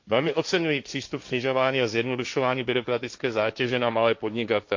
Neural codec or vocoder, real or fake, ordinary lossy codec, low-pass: codec, 16 kHz, 1.1 kbps, Voila-Tokenizer; fake; MP3, 48 kbps; 7.2 kHz